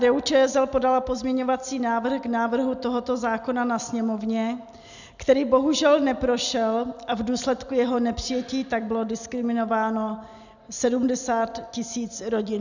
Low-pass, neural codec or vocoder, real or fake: 7.2 kHz; none; real